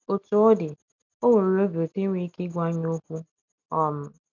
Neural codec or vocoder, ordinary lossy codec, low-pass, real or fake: none; none; 7.2 kHz; real